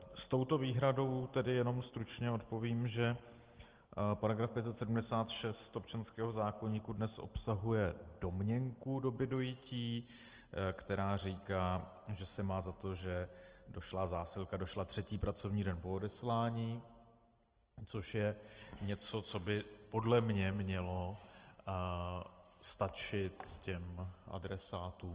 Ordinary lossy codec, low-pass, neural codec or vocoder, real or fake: Opus, 16 kbps; 3.6 kHz; none; real